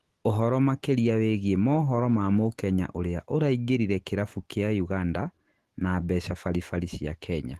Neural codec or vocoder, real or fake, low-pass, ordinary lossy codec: autoencoder, 48 kHz, 128 numbers a frame, DAC-VAE, trained on Japanese speech; fake; 14.4 kHz; Opus, 16 kbps